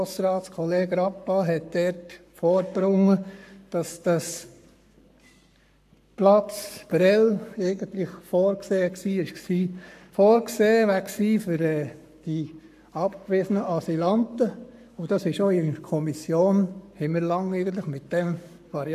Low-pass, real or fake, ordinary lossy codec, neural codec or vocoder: 14.4 kHz; fake; AAC, 96 kbps; codec, 44.1 kHz, 7.8 kbps, Pupu-Codec